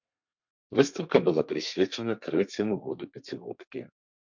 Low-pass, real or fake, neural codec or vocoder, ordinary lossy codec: 7.2 kHz; fake; codec, 32 kHz, 1.9 kbps, SNAC; MP3, 64 kbps